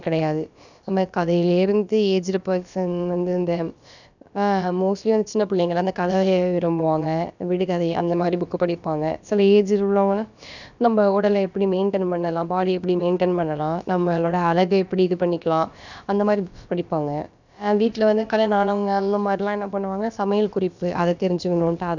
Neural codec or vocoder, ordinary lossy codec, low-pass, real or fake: codec, 16 kHz, about 1 kbps, DyCAST, with the encoder's durations; none; 7.2 kHz; fake